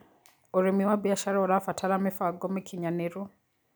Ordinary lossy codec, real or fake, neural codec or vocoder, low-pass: none; real; none; none